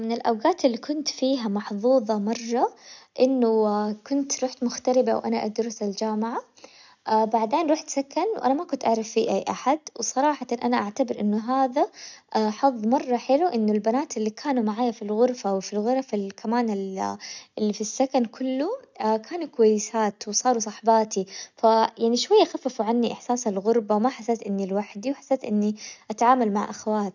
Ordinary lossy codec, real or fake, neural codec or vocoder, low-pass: none; real; none; 7.2 kHz